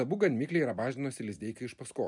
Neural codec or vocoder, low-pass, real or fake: none; 10.8 kHz; real